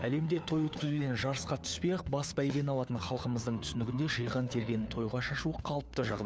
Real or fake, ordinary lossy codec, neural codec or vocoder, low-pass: fake; none; codec, 16 kHz, 4 kbps, FunCodec, trained on LibriTTS, 50 frames a second; none